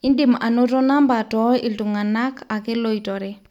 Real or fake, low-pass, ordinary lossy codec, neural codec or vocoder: real; 19.8 kHz; none; none